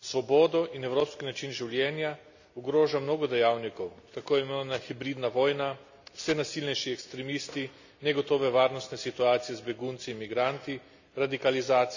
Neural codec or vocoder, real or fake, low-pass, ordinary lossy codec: none; real; 7.2 kHz; none